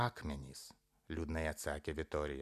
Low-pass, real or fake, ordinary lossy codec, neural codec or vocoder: 14.4 kHz; real; MP3, 96 kbps; none